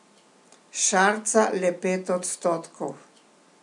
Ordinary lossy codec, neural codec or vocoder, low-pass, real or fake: MP3, 96 kbps; none; 10.8 kHz; real